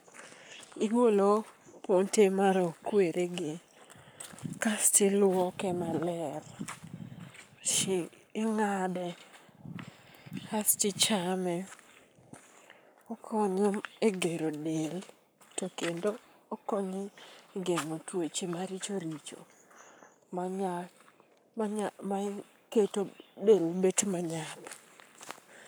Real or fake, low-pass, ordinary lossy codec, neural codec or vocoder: fake; none; none; codec, 44.1 kHz, 7.8 kbps, Pupu-Codec